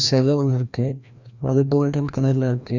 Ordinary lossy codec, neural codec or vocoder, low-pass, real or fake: none; codec, 16 kHz, 1 kbps, FreqCodec, larger model; 7.2 kHz; fake